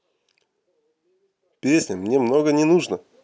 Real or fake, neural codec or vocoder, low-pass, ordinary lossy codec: real; none; none; none